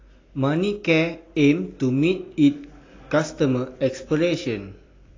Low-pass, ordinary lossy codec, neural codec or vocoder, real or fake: 7.2 kHz; AAC, 32 kbps; none; real